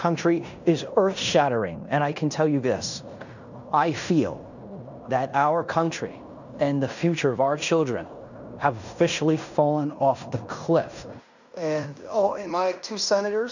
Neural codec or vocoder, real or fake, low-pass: codec, 16 kHz in and 24 kHz out, 0.9 kbps, LongCat-Audio-Codec, fine tuned four codebook decoder; fake; 7.2 kHz